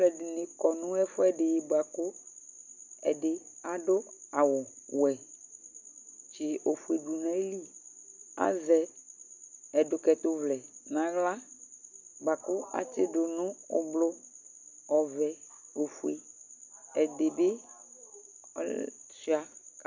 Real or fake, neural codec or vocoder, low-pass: real; none; 7.2 kHz